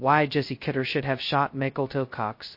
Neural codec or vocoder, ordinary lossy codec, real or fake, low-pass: codec, 16 kHz, 0.2 kbps, FocalCodec; MP3, 32 kbps; fake; 5.4 kHz